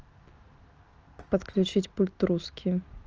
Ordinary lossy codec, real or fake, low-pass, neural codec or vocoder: Opus, 24 kbps; real; 7.2 kHz; none